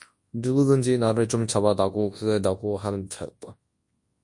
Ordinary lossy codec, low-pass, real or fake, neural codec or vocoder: MP3, 48 kbps; 10.8 kHz; fake; codec, 24 kHz, 0.9 kbps, WavTokenizer, large speech release